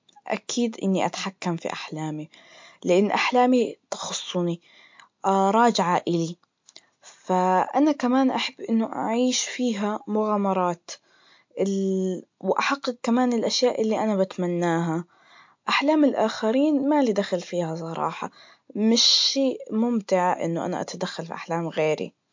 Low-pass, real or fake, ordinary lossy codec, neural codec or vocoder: 7.2 kHz; real; MP3, 48 kbps; none